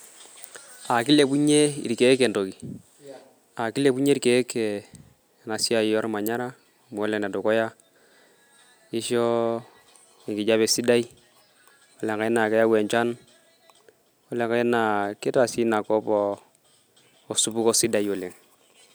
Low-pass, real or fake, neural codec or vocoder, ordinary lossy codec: none; real; none; none